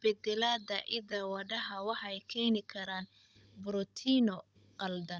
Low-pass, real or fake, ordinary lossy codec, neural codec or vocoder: none; fake; none; codec, 16 kHz, 16 kbps, FunCodec, trained on Chinese and English, 50 frames a second